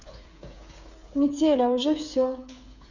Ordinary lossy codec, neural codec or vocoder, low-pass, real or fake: none; codec, 16 kHz, 8 kbps, FreqCodec, smaller model; 7.2 kHz; fake